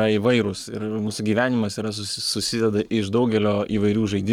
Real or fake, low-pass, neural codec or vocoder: fake; 19.8 kHz; codec, 44.1 kHz, 7.8 kbps, Pupu-Codec